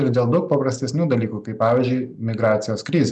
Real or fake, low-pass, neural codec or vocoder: real; 10.8 kHz; none